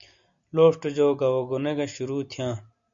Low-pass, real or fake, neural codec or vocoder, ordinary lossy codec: 7.2 kHz; real; none; MP3, 48 kbps